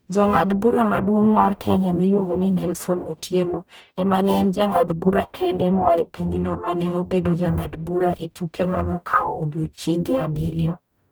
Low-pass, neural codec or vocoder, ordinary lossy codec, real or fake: none; codec, 44.1 kHz, 0.9 kbps, DAC; none; fake